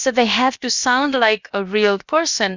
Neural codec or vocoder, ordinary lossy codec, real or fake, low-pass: codec, 16 kHz, about 1 kbps, DyCAST, with the encoder's durations; Opus, 64 kbps; fake; 7.2 kHz